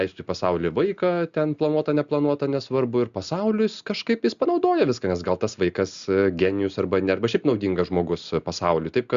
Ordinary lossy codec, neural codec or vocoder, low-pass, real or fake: Opus, 64 kbps; none; 7.2 kHz; real